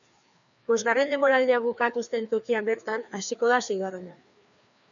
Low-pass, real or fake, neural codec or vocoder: 7.2 kHz; fake; codec, 16 kHz, 2 kbps, FreqCodec, larger model